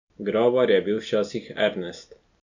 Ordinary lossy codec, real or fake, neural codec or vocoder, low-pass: none; real; none; 7.2 kHz